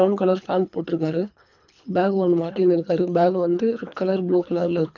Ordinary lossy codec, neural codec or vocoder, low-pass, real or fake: none; codec, 24 kHz, 3 kbps, HILCodec; 7.2 kHz; fake